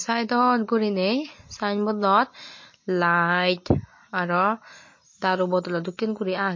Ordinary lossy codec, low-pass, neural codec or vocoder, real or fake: MP3, 32 kbps; 7.2 kHz; none; real